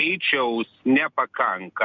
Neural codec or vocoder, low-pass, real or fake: none; 7.2 kHz; real